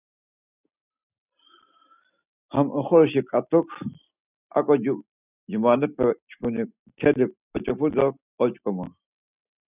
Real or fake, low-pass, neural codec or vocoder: real; 3.6 kHz; none